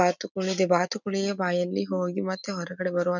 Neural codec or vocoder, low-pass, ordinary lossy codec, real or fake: none; 7.2 kHz; none; real